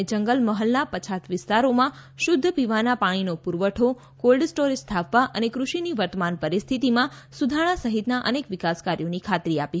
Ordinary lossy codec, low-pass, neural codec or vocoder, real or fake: none; none; none; real